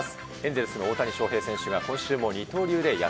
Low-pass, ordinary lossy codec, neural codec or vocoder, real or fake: none; none; none; real